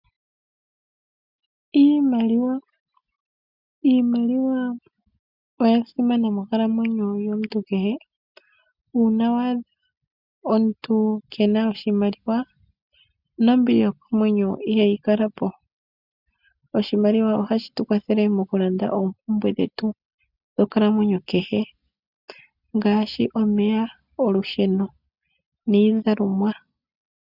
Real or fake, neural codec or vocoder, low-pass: real; none; 5.4 kHz